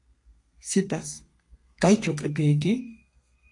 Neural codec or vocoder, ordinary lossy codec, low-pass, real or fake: codec, 32 kHz, 1.9 kbps, SNAC; AAC, 48 kbps; 10.8 kHz; fake